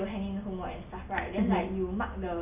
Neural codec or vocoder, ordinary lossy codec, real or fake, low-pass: none; none; real; 3.6 kHz